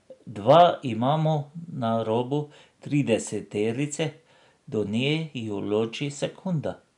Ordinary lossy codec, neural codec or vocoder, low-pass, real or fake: none; none; 10.8 kHz; real